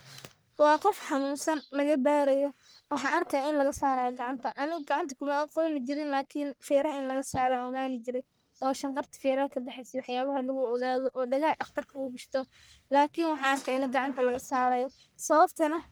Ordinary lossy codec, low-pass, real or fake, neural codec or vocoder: none; none; fake; codec, 44.1 kHz, 1.7 kbps, Pupu-Codec